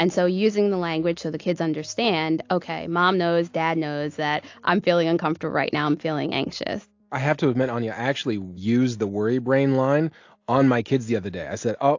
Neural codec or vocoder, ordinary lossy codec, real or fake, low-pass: none; AAC, 48 kbps; real; 7.2 kHz